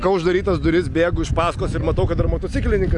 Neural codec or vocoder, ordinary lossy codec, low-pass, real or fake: none; AAC, 64 kbps; 10.8 kHz; real